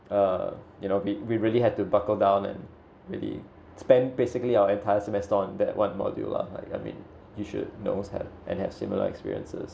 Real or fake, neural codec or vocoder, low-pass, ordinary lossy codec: real; none; none; none